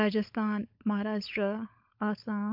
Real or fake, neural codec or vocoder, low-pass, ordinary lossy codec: fake; codec, 16 kHz, 16 kbps, FunCodec, trained on LibriTTS, 50 frames a second; 5.4 kHz; MP3, 48 kbps